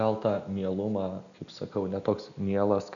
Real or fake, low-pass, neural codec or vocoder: real; 7.2 kHz; none